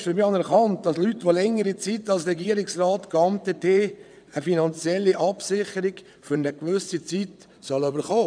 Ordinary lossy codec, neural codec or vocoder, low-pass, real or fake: none; vocoder, 22.05 kHz, 80 mel bands, WaveNeXt; 9.9 kHz; fake